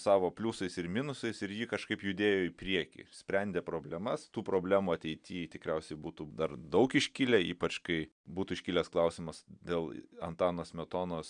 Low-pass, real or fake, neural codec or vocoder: 9.9 kHz; real; none